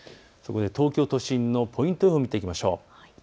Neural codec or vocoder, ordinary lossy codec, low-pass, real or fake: none; none; none; real